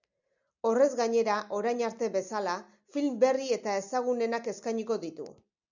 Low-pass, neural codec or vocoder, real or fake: 7.2 kHz; none; real